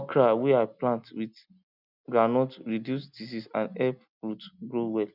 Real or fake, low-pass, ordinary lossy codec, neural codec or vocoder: real; 5.4 kHz; none; none